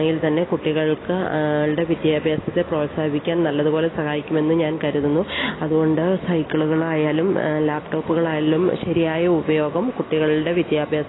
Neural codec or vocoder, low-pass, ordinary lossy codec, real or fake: none; 7.2 kHz; AAC, 16 kbps; real